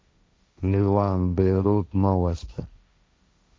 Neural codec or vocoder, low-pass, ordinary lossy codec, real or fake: codec, 16 kHz, 1.1 kbps, Voila-Tokenizer; none; none; fake